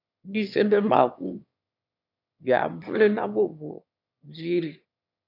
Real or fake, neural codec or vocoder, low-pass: fake; autoencoder, 22.05 kHz, a latent of 192 numbers a frame, VITS, trained on one speaker; 5.4 kHz